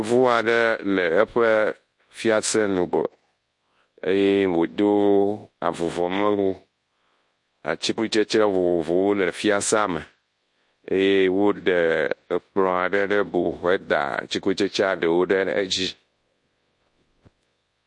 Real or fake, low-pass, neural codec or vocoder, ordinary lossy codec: fake; 10.8 kHz; codec, 24 kHz, 0.9 kbps, WavTokenizer, large speech release; MP3, 48 kbps